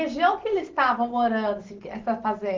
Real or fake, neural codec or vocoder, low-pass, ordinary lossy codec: real; none; 7.2 kHz; Opus, 16 kbps